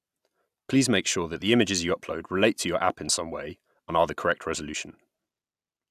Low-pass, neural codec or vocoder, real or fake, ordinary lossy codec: 14.4 kHz; none; real; AAC, 96 kbps